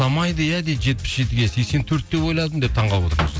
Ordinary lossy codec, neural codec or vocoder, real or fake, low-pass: none; none; real; none